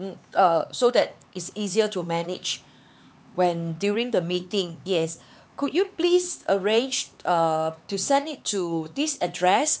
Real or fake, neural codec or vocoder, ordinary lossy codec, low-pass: fake; codec, 16 kHz, 4 kbps, X-Codec, HuBERT features, trained on LibriSpeech; none; none